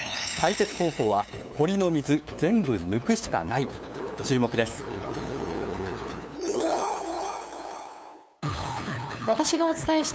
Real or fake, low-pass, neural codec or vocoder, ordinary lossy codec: fake; none; codec, 16 kHz, 2 kbps, FunCodec, trained on LibriTTS, 25 frames a second; none